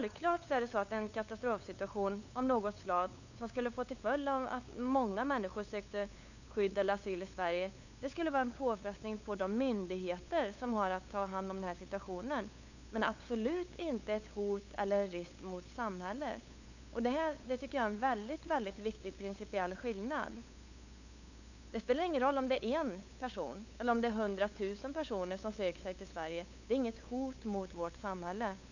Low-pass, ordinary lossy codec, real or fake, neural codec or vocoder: 7.2 kHz; none; fake; codec, 16 kHz, 8 kbps, FunCodec, trained on LibriTTS, 25 frames a second